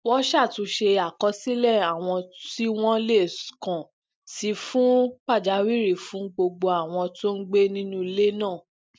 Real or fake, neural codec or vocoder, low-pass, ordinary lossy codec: real; none; none; none